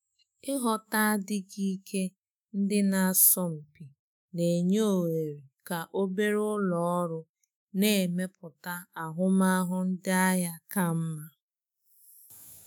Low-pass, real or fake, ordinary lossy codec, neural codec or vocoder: none; fake; none; autoencoder, 48 kHz, 128 numbers a frame, DAC-VAE, trained on Japanese speech